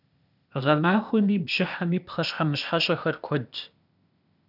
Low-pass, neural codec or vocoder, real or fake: 5.4 kHz; codec, 16 kHz, 0.8 kbps, ZipCodec; fake